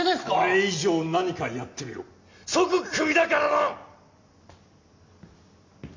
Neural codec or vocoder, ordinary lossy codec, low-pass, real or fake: none; MP3, 48 kbps; 7.2 kHz; real